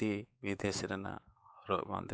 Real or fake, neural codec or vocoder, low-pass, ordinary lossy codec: fake; codec, 16 kHz, 4 kbps, X-Codec, WavLM features, trained on Multilingual LibriSpeech; none; none